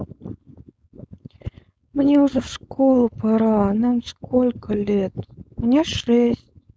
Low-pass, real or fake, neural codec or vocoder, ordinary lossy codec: none; fake; codec, 16 kHz, 4.8 kbps, FACodec; none